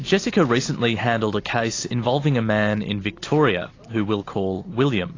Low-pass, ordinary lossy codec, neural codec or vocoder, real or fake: 7.2 kHz; AAC, 32 kbps; none; real